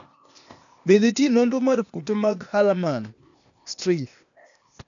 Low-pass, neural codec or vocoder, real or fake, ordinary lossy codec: 7.2 kHz; codec, 16 kHz, 0.8 kbps, ZipCodec; fake; none